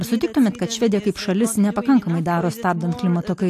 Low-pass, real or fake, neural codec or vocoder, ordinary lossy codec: 14.4 kHz; real; none; AAC, 64 kbps